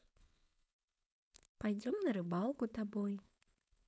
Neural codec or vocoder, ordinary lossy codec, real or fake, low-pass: codec, 16 kHz, 4.8 kbps, FACodec; none; fake; none